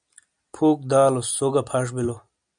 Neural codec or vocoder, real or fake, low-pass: none; real; 9.9 kHz